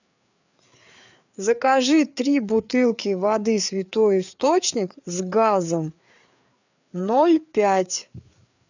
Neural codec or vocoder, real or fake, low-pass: codec, 16 kHz, 4 kbps, FreqCodec, larger model; fake; 7.2 kHz